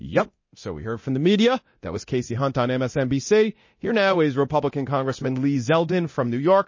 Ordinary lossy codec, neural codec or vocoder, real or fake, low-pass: MP3, 32 kbps; codec, 24 kHz, 0.9 kbps, DualCodec; fake; 7.2 kHz